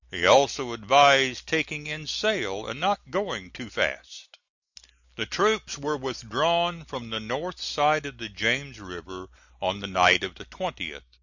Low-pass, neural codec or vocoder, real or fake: 7.2 kHz; none; real